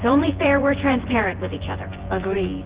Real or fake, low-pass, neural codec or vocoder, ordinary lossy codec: fake; 3.6 kHz; vocoder, 24 kHz, 100 mel bands, Vocos; Opus, 16 kbps